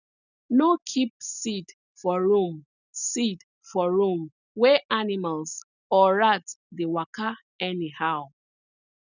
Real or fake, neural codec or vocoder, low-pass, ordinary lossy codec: real; none; 7.2 kHz; none